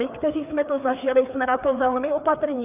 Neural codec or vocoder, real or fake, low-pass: codec, 16 kHz, 4 kbps, FreqCodec, larger model; fake; 3.6 kHz